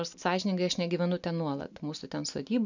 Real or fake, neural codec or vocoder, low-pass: real; none; 7.2 kHz